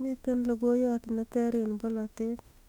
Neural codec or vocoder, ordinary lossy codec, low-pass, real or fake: autoencoder, 48 kHz, 32 numbers a frame, DAC-VAE, trained on Japanese speech; none; 19.8 kHz; fake